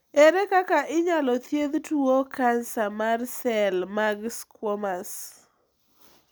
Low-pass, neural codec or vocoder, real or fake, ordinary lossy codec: none; none; real; none